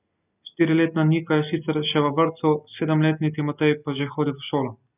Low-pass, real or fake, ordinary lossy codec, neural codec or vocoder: 3.6 kHz; real; none; none